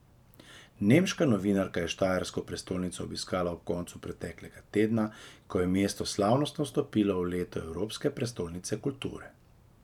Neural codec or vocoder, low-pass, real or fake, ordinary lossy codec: vocoder, 44.1 kHz, 128 mel bands every 512 samples, BigVGAN v2; 19.8 kHz; fake; none